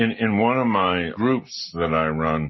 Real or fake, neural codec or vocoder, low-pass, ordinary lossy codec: real; none; 7.2 kHz; MP3, 24 kbps